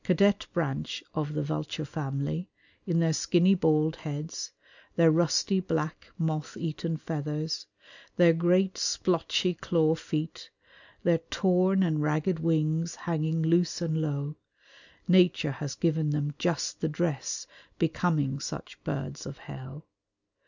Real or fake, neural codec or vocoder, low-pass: real; none; 7.2 kHz